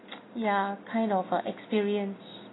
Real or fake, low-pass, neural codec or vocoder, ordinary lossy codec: real; 7.2 kHz; none; AAC, 16 kbps